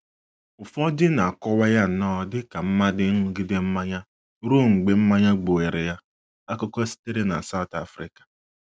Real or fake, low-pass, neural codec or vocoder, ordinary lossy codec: real; none; none; none